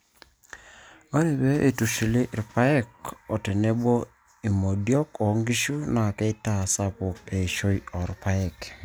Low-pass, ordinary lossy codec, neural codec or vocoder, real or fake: none; none; none; real